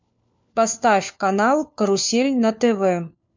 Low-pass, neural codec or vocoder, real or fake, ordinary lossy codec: 7.2 kHz; codec, 16 kHz, 4 kbps, FunCodec, trained on LibriTTS, 50 frames a second; fake; MP3, 64 kbps